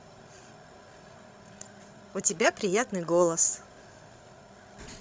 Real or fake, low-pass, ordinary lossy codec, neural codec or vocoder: fake; none; none; codec, 16 kHz, 16 kbps, FreqCodec, larger model